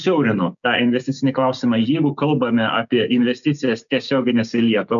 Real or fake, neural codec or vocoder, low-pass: fake; codec, 16 kHz, 6 kbps, DAC; 7.2 kHz